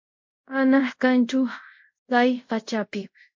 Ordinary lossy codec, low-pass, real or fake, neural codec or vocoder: MP3, 48 kbps; 7.2 kHz; fake; codec, 24 kHz, 0.5 kbps, DualCodec